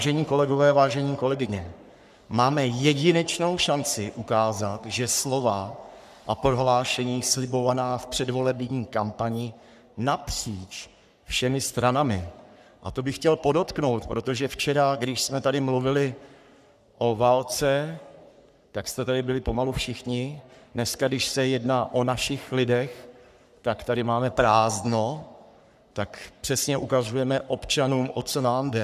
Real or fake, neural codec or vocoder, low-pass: fake; codec, 44.1 kHz, 3.4 kbps, Pupu-Codec; 14.4 kHz